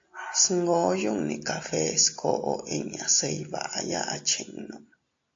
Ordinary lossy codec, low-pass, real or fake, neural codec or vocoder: AAC, 48 kbps; 7.2 kHz; real; none